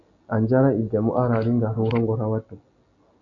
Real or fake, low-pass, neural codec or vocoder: real; 7.2 kHz; none